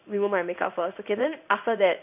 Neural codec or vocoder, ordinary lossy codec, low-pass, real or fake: codec, 16 kHz in and 24 kHz out, 1 kbps, XY-Tokenizer; none; 3.6 kHz; fake